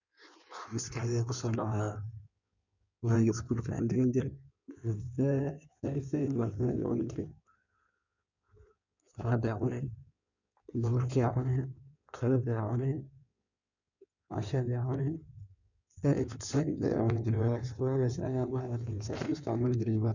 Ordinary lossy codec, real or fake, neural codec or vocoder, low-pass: none; fake; codec, 16 kHz in and 24 kHz out, 1.1 kbps, FireRedTTS-2 codec; 7.2 kHz